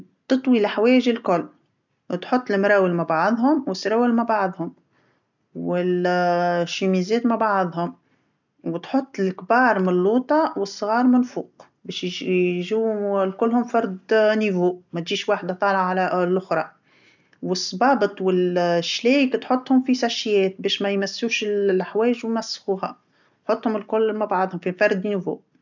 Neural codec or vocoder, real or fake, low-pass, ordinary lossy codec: none; real; 7.2 kHz; none